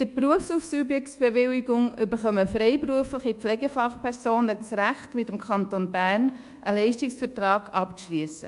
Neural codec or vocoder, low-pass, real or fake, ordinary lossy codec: codec, 24 kHz, 1.2 kbps, DualCodec; 10.8 kHz; fake; AAC, 64 kbps